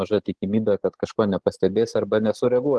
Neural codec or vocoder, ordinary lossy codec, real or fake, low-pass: none; Opus, 16 kbps; real; 10.8 kHz